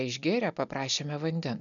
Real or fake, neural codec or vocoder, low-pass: real; none; 7.2 kHz